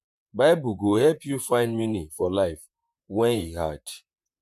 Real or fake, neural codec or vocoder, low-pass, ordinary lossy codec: fake; vocoder, 44.1 kHz, 128 mel bands, Pupu-Vocoder; 14.4 kHz; none